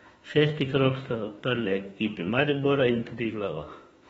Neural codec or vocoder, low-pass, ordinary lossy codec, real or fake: autoencoder, 48 kHz, 32 numbers a frame, DAC-VAE, trained on Japanese speech; 19.8 kHz; AAC, 24 kbps; fake